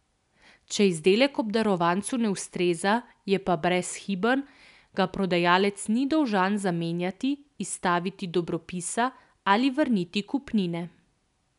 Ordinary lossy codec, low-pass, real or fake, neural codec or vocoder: none; 10.8 kHz; real; none